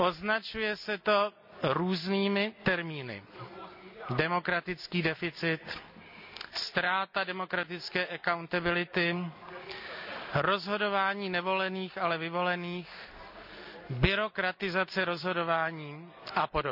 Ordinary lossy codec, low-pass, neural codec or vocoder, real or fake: none; 5.4 kHz; none; real